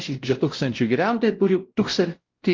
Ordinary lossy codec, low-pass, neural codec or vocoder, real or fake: Opus, 32 kbps; 7.2 kHz; codec, 16 kHz, 0.5 kbps, X-Codec, WavLM features, trained on Multilingual LibriSpeech; fake